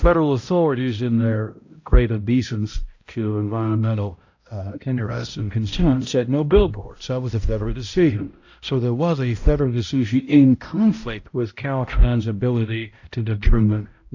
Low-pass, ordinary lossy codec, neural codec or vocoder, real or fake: 7.2 kHz; AAC, 48 kbps; codec, 16 kHz, 0.5 kbps, X-Codec, HuBERT features, trained on balanced general audio; fake